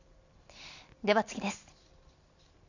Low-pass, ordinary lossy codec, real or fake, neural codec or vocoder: 7.2 kHz; none; fake; vocoder, 44.1 kHz, 128 mel bands every 256 samples, BigVGAN v2